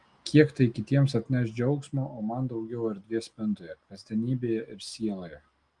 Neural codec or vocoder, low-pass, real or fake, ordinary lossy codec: none; 9.9 kHz; real; Opus, 24 kbps